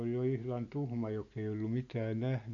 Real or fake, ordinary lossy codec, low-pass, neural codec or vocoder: real; none; 7.2 kHz; none